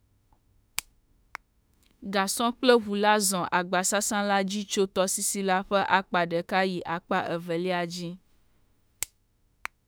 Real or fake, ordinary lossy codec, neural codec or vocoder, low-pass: fake; none; autoencoder, 48 kHz, 32 numbers a frame, DAC-VAE, trained on Japanese speech; none